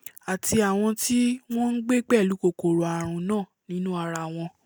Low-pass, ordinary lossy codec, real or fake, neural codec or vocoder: none; none; real; none